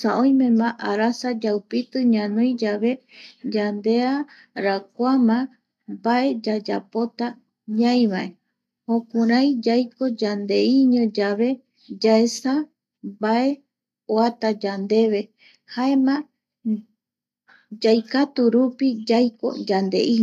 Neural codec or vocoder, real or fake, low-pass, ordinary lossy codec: none; real; 14.4 kHz; none